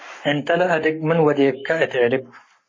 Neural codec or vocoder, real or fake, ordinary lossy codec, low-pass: codec, 44.1 kHz, 7.8 kbps, Pupu-Codec; fake; MP3, 32 kbps; 7.2 kHz